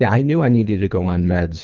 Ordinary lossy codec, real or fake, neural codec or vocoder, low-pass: Opus, 32 kbps; fake; codec, 24 kHz, 3 kbps, HILCodec; 7.2 kHz